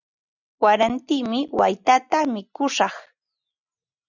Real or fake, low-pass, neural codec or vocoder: real; 7.2 kHz; none